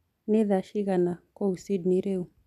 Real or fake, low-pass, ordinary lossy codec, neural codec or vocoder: real; 14.4 kHz; none; none